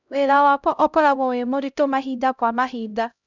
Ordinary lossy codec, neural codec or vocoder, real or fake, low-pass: none; codec, 16 kHz, 0.5 kbps, X-Codec, HuBERT features, trained on LibriSpeech; fake; 7.2 kHz